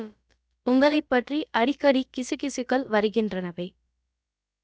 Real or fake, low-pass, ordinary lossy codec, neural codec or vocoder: fake; none; none; codec, 16 kHz, about 1 kbps, DyCAST, with the encoder's durations